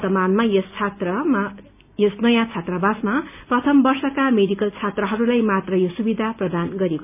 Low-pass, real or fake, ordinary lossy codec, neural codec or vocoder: 3.6 kHz; real; none; none